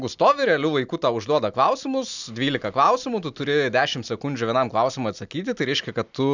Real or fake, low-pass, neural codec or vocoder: real; 7.2 kHz; none